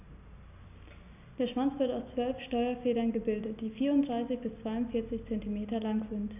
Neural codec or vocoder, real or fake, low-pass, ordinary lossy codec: none; real; 3.6 kHz; none